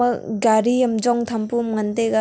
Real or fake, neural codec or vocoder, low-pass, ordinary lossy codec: real; none; none; none